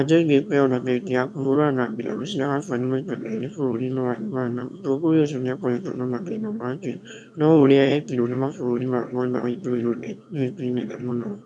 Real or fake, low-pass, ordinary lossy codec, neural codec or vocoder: fake; none; none; autoencoder, 22.05 kHz, a latent of 192 numbers a frame, VITS, trained on one speaker